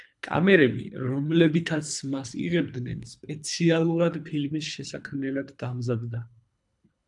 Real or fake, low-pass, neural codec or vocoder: fake; 10.8 kHz; codec, 24 kHz, 3 kbps, HILCodec